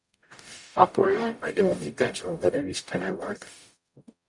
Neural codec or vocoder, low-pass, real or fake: codec, 44.1 kHz, 0.9 kbps, DAC; 10.8 kHz; fake